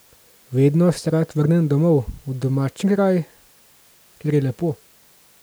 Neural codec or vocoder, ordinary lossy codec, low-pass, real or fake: none; none; none; real